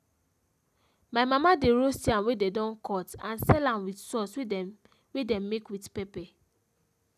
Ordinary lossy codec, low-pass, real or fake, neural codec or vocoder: none; 14.4 kHz; real; none